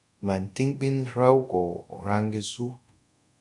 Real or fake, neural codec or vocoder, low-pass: fake; codec, 24 kHz, 0.5 kbps, DualCodec; 10.8 kHz